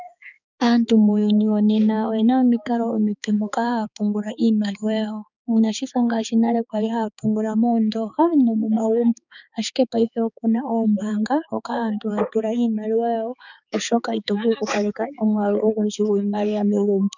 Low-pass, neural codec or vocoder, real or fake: 7.2 kHz; codec, 16 kHz, 4 kbps, X-Codec, HuBERT features, trained on balanced general audio; fake